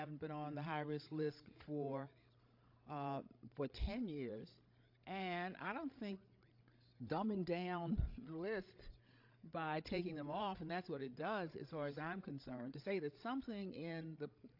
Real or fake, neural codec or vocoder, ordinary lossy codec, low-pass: fake; codec, 16 kHz, 16 kbps, FreqCodec, larger model; AAC, 48 kbps; 5.4 kHz